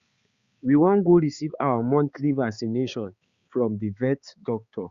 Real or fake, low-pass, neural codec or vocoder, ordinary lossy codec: fake; 7.2 kHz; codec, 16 kHz, 4 kbps, X-Codec, HuBERT features, trained on balanced general audio; Opus, 64 kbps